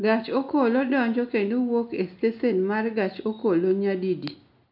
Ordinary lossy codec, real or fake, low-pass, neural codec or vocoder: none; real; 5.4 kHz; none